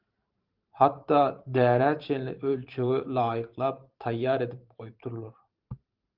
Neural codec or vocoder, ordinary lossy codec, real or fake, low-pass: vocoder, 44.1 kHz, 128 mel bands every 512 samples, BigVGAN v2; Opus, 32 kbps; fake; 5.4 kHz